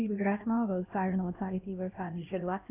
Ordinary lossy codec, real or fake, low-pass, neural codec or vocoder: none; fake; 3.6 kHz; codec, 16 kHz, 1 kbps, X-Codec, HuBERT features, trained on LibriSpeech